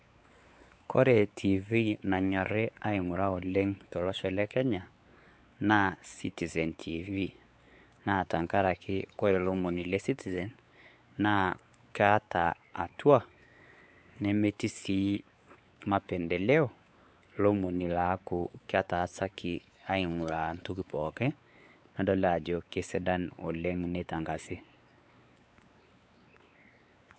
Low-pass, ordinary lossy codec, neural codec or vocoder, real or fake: none; none; codec, 16 kHz, 4 kbps, X-Codec, WavLM features, trained on Multilingual LibriSpeech; fake